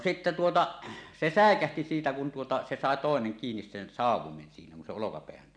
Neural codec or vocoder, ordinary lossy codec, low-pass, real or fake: none; none; 9.9 kHz; real